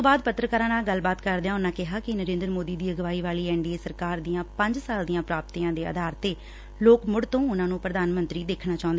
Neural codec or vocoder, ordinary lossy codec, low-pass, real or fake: none; none; none; real